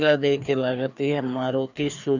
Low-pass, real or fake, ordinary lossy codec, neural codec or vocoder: 7.2 kHz; fake; none; codec, 16 kHz, 2 kbps, FreqCodec, larger model